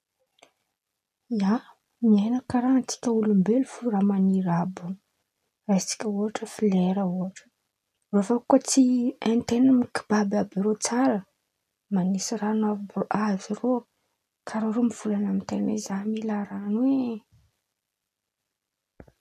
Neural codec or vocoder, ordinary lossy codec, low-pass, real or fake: none; none; 14.4 kHz; real